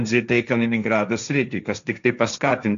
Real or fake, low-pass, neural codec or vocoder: fake; 7.2 kHz; codec, 16 kHz, 1.1 kbps, Voila-Tokenizer